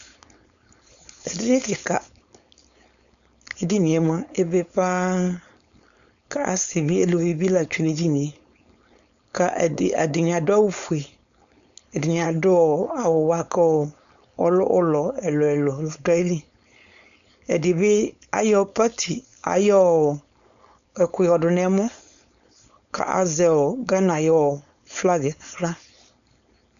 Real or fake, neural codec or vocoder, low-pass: fake; codec, 16 kHz, 4.8 kbps, FACodec; 7.2 kHz